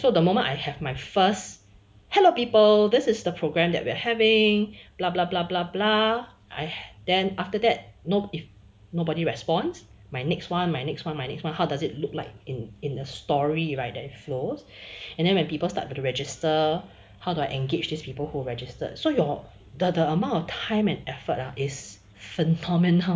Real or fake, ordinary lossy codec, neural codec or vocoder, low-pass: real; none; none; none